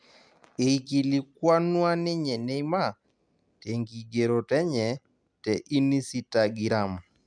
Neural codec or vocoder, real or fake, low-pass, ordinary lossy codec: none; real; 9.9 kHz; none